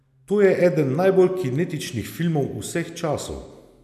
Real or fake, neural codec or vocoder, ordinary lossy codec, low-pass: real; none; none; 14.4 kHz